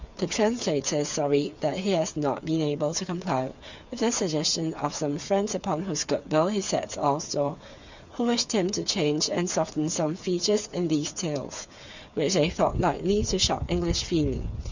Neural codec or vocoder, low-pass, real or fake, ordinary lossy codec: codec, 16 kHz, 8 kbps, FreqCodec, smaller model; 7.2 kHz; fake; Opus, 64 kbps